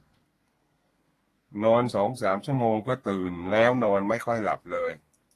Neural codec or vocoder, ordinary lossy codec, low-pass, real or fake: codec, 32 kHz, 1.9 kbps, SNAC; AAC, 48 kbps; 14.4 kHz; fake